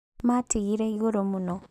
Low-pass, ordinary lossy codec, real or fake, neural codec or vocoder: 14.4 kHz; none; real; none